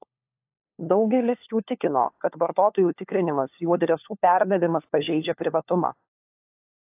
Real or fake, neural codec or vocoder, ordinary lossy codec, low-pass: fake; codec, 16 kHz, 4 kbps, FunCodec, trained on LibriTTS, 50 frames a second; AAC, 32 kbps; 3.6 kHz